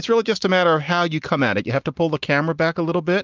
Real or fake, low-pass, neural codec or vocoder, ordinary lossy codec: real; 7.2 kHz; none; Opus, 24 kbps